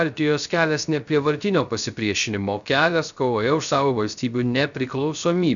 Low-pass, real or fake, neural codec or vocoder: 7.2 kHz; fake; codec, 16 kHz, 0.3 kbps, FocalCodec